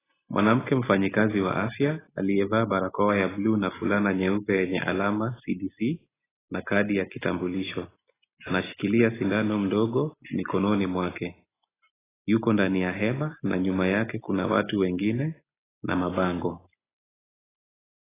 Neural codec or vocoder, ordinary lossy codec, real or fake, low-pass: none; AAC, 16 kbps; real; 3.6 kHz